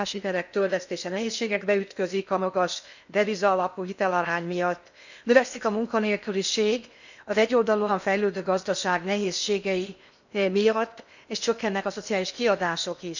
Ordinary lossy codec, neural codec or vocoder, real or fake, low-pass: none; codec, 16 kHz in and 24 kHz out, 0.8 kbps, FocalCodec, streaming, 65536 codes; fake; 7.2 kHz